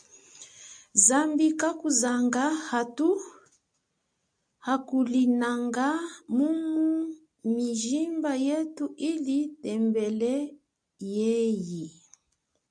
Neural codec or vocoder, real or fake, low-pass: none; real; 9.9 kHz